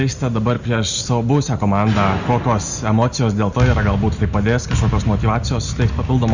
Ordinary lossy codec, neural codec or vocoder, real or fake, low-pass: Opus, 64 kbps; none; real; 7.2 kHz